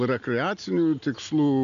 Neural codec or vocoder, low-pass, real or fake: none; 7.2 kHz; real